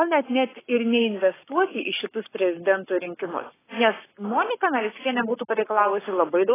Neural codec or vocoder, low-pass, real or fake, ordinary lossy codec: codec, 44.1 kHz, 7.8 kbps, Pupu-Codec; 3.6 kHz; fake; AAC, 16 kbps